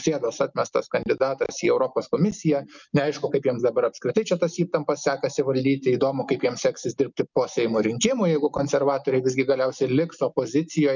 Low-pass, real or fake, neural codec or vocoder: 7.2 kHz; real; none